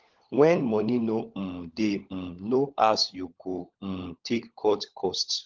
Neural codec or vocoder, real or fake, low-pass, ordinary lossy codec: codec, 16 kHz, 16 kbps, FunCodec, trained on LibriTTS, 50 frames a second; fake; 7.2 kHz; Opus, 16 kbps